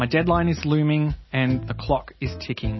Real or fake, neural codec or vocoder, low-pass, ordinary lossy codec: real; none; 7.2 kHz; MP3, 24 kbps